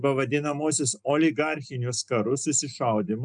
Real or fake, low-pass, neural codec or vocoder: real; 9.9 kHz; none